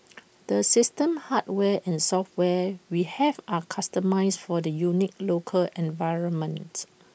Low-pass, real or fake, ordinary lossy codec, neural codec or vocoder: none; real; none; none